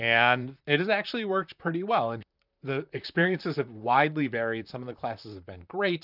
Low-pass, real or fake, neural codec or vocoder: 5.4 kHz; fake; vocoder, 44.1 kHz, 128 mel bands, Pupu-Vocoder